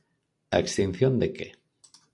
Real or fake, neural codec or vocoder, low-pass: fake; vocoder, 44.1 kHz, 128 mel bands every 256 samples, BigVGAN v2; 10.8 kHz